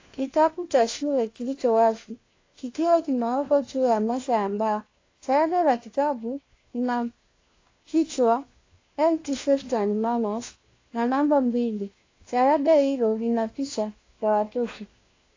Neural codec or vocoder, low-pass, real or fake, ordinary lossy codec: codec, 16 kHz, 1 kbps, FunCodec, trained on LibriTTS, 50 frames a second; 7.2 kHz; fake; AAC, 32 kbps